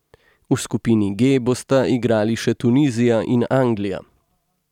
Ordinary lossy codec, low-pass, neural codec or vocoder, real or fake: none; 19.8 kHz; none; real